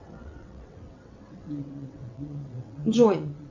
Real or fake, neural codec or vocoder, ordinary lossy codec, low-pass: fake; vocoder, 22.05 kHz, 80 mel bands, WaveNeXt; MP3, 64 kbps; 7.2 kHz